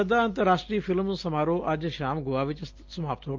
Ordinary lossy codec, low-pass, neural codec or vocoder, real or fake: Opus, 32 kbps; 7.2 kHz; none; real